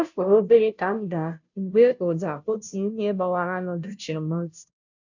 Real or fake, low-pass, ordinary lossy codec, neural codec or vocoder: fake; 7.2 kHz; none; codec, 16 kHz, 0.5 kbps, FunCodec, trained on Chinese and English, 25 frames a second